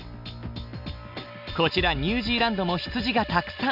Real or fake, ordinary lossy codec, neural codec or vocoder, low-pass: real; none; none; 5.4 kHz